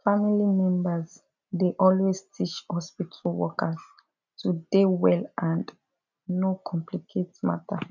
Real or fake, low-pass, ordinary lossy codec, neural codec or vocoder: real; 7.2 kHz; none; none